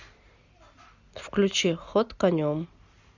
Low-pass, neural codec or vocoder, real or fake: 7.2 kHz; none; real